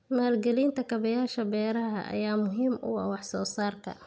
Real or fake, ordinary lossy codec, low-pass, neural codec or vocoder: real; none; none; none